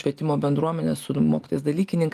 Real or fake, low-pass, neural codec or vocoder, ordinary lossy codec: real; 14.4 kHz; none; Opus, 32 kbps